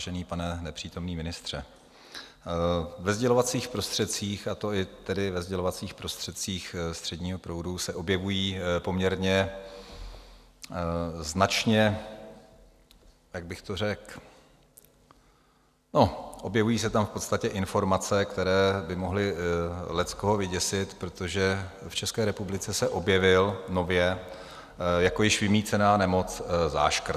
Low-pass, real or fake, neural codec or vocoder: 14.4 kHz; real; none